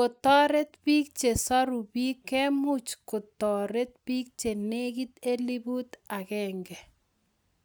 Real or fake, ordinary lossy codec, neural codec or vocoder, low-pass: real; none; none; none